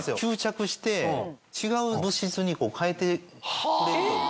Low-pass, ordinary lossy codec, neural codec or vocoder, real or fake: none; none; none; real